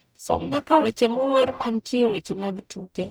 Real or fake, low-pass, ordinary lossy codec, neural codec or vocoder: fake; none; none; codec, 44.1 kHz, 0.9 kbps, DAC